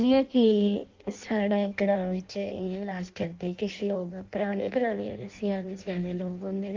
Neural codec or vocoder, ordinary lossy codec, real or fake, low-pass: codec, 16 kHz in and 24 kHz out, 0.6 kbps, FireRedTTS-2 codec; Opus, 24 kbps; fake; 7.2 kHz